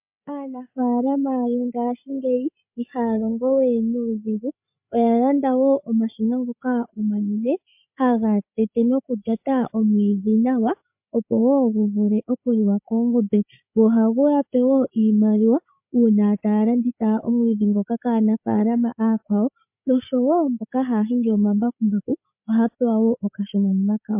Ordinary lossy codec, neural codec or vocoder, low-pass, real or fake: MP3, 32 kbps; codec, 16 kHz, 8 kbps, FreqCodec, larger model; 3.6 kHz; fake